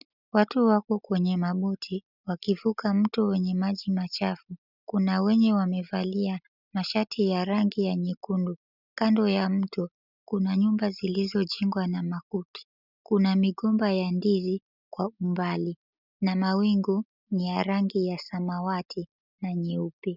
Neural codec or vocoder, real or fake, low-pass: none; real; 5.4 kHz